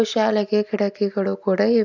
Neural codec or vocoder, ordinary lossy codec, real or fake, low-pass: none; none; real; 7.2 kHz